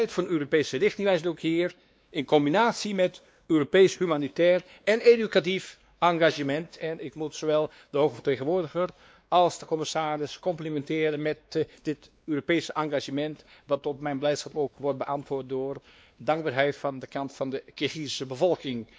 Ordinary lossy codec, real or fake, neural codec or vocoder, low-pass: none; fake; codec, 16 kHz, 2 kbps, X-Codec, WavLM features, trained on Multilingual LibriSpeech; none